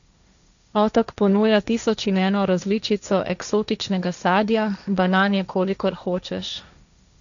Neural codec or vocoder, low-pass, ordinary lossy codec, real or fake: codec, 16 kHz, 1.1 kbps, Voila-Tokenizer; 7.2 kHz; none; fake